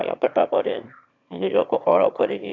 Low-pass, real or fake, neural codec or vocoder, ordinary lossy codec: 7.2 kHz; fake; autoencoder, 22.05 kHz, a latent of 192 numbers a frame, VITS, trained on one speaker; none